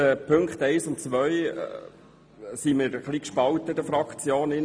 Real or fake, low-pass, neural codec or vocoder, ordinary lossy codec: real; none; none; none